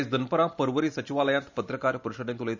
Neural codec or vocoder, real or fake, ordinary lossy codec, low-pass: none; real; none; 7.2 kHz